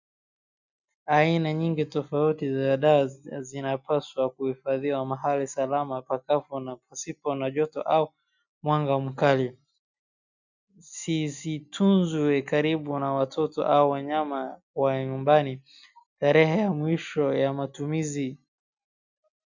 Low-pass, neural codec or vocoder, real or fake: 7.2 kHz; none; real